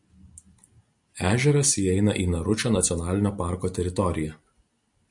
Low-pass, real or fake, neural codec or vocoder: 10.8 kHz; real; none